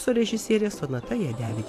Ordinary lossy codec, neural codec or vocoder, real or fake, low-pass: AAC, 64 kbps; vocoder, 44.1 kHz, 128 mel bands every 512 samples, BigVGAN v2; fake; 14.4 kHz